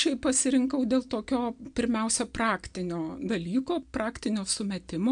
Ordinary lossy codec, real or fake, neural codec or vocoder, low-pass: MP3, 96 kbps; real; none; 9.9 kHz